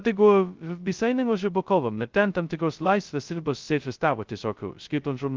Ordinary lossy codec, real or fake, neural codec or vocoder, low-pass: Opus, 32 kbps; fake; codec, 16 kHz, 0.2 kbps, FocalCodec; 7.2 kHz